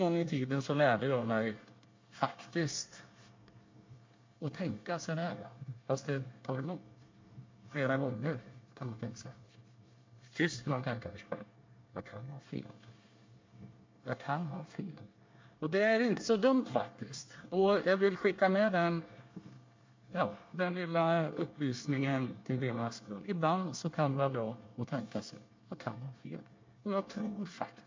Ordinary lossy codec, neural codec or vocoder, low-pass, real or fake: MP3, 48 kbps; codec, 24 kHz, 1 kbps, SNAC; 7.2 kHz; fake